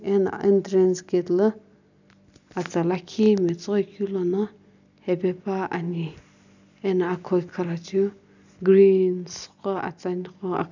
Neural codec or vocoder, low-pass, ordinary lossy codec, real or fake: none; 7.2 kHz; none; real